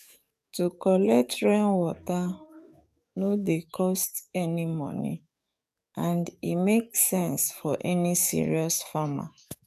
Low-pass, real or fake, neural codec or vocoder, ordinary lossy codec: 14.4 kHz; fake; codec, 44.1 kHz, 7.8 kbps, DAC; none